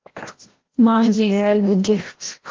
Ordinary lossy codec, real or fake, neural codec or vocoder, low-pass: Opus, 16 kbps; fake; codec, 16 kHz, 0.5 kbps, FreqCodec, larger model; 7.2 kHz